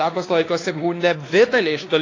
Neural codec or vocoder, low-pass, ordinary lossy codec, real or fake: codec, 16 kHz, 2 kbps, X-Codec, WavLM features, trained on Multilingual LibriSpeech; 7.2 kHz; AAC, 32 kbps; fake